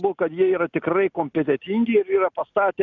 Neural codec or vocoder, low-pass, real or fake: none; 7.2 kHz; real